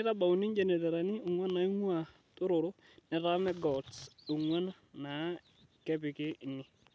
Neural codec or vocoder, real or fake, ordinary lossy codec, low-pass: none; real; none; none